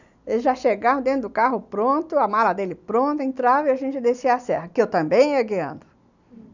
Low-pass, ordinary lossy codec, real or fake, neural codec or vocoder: 7.2 kHz; none; real; none